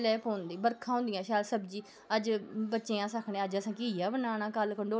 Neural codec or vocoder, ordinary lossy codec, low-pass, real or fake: none; none; none; real